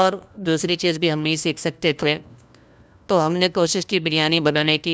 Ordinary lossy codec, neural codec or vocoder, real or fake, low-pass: none; codec, 16 kHz, 1 kbps, FunCodec, trained on LibriTTS, 50 frames a second; fake; none